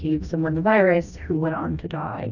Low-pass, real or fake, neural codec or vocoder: 7.2 kHz; fake; codec, 16 kHz, 1 kbps, FreqCodec, smaller model